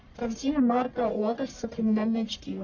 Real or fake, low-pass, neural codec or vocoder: fake; 7.2 kHz; codec, 44.1 kHz, 1.7 kbps, Pupu-Codec